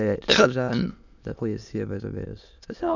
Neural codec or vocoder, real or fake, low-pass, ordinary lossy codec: autoencoder, 22.05 kHz, a latent of 192 numbers a frame, VITS, trained on many speakers; fake; 7.2 kHz; none